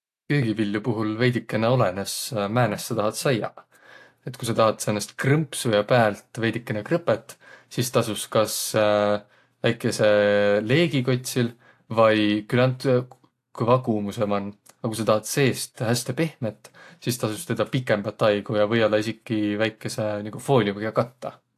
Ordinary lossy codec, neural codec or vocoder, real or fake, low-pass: AAC, 64 kbps; none; real; 14.4 kHz